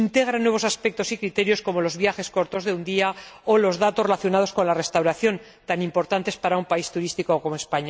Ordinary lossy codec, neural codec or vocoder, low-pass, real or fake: none; none; none; real